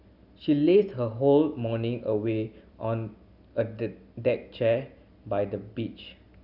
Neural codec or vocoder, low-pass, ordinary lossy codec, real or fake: none; 5.4 kHz; none; real